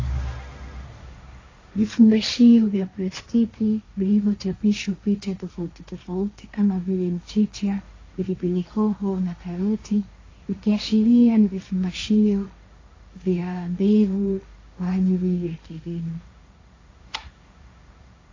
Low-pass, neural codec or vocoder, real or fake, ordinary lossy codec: 7.2 kHz; codec, 16 kHz, 1.1 kbps, Voila-Tokenizer; fake; AAC, 32 kbps